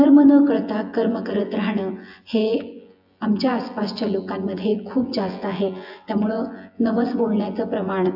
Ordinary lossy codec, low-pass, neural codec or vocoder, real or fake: AAC, 48 kbps; 5.4 kHz; vocoder, 24 kHz, 100 mel bands, Vocos; fake